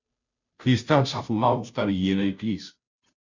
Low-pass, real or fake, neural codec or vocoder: 7.2 kHz; fake; codec, 16 kHz, 0.5 kbps, FunCodec, trained on Chinese and English, 25 frames a second